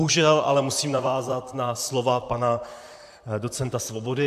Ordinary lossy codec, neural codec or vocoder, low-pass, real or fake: AAC, 96 kbps; vocoder, 44.1 kHz, 128 mel bands, Pupu-Vocoder; 14.4 kHz; fake